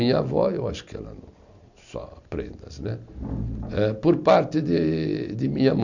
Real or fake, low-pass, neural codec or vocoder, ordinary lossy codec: real; 7.2 kHz; none; none